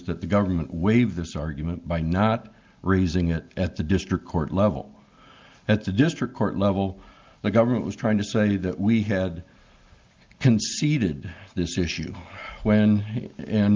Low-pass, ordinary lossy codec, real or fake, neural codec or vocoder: 7.2 kHz; Opus, 32 kbps; real; none